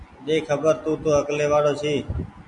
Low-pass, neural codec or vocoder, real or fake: 10.8 kHz; none; real